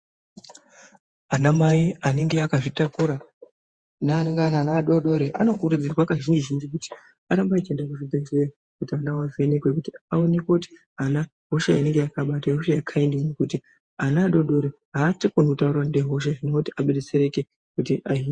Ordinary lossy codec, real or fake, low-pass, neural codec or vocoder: Opus, 64 kbps; fake; 9.9 kHz; vocoder, 48 kHz, 128 mel bands, Vocos